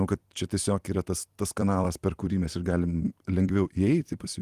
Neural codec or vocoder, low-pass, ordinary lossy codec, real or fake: vocoder, 44.1 kHz, 128 mel bands every 256 samples, BigVGAN v2; 14.4 kHz; Opus, 24 kbps; fake